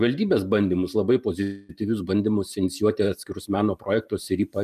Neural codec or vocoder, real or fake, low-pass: vocoder, 44.1 kHz, 128 mel bands every 512 samples, BigVGAN v2; fake; 14.4 kHz